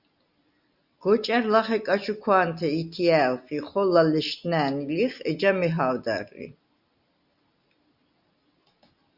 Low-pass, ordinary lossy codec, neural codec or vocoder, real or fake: 5.4 kHz; Opus, 64 kbps; none; real